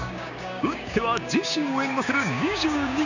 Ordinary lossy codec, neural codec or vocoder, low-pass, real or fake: none; codec, 16 kHz, 6 kbps, DAC; 7.2 kHz; fake